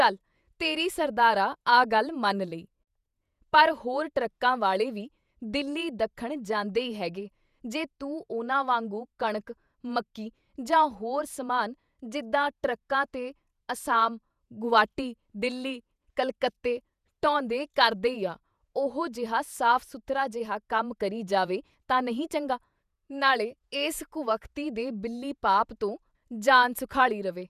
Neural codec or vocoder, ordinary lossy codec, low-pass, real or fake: vocoder, 48 kHz, 128 mel bands, Vocos; none; 14.4 kHz; fake